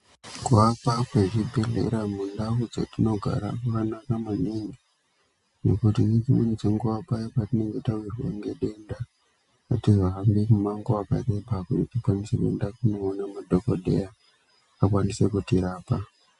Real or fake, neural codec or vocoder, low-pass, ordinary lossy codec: real; none; 10.8 kHz; AAC, 96 kbps